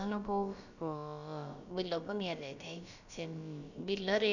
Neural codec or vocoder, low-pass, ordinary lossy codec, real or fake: codec, 16 kHz, about 1 kbps, DyCAST, with the encoder's durations; 7.2 kHz; none; fake